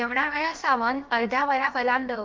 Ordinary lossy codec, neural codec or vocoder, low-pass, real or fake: Opus, 24 kbps; codec, 16 kHz, about 1 kbps, DyCAST, with the encoder's durations; 7.2 kHz; fake